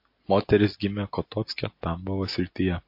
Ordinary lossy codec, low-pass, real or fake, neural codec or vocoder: MP3, 32 kbps; 5.4 kHz; real; none